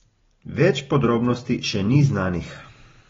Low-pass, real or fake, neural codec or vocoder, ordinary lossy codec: 7.2 kHz; real; none; AAC, 24 kbps